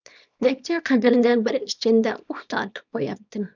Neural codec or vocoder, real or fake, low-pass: codec, 24 kHz, 0.9 kbps, WavTokenizer, small release; fake; 7.2 kHz